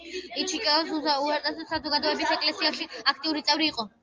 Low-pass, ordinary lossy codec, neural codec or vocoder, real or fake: 7.2 kHz; Opus, 24 kbps; none; real